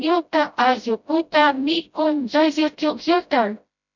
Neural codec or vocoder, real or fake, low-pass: codec, 16 kHz, 0.5 kbps, FreqCodec, smaller model; fake; 7.2 kHz